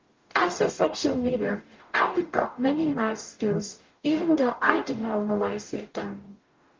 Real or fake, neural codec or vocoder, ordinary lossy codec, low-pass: fake; codec, 44.1 kHz, 0.9 kbps, DAC; Opus, 32 kbps; 7.2 kHz